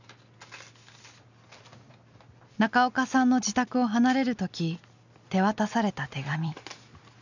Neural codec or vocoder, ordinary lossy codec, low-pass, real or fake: none; none; 7.2 kHz; real